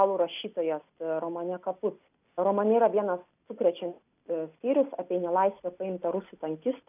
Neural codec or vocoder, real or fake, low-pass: none; real; 3.6 kHz